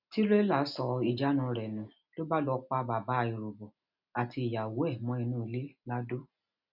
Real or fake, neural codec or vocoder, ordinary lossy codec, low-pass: real; none; none; 5.4 kHz